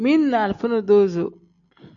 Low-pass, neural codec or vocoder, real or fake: 7.2 kHz; none; real